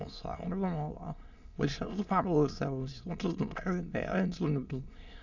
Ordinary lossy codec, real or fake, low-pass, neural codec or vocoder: none; fake; 7.2 kHz; autoencoder, 22.05 kHz, a latent of 192 numbers a frame, VITS, trained on many speakers